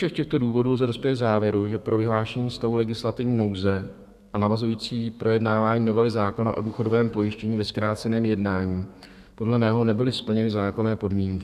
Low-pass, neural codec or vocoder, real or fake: 14.4 kHz; codec, 32 kHz, 1.9 kbps, SNAC; fake